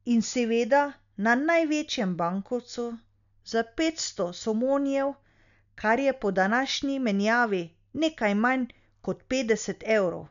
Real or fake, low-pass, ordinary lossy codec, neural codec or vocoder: real; 7.2 kHz; none; none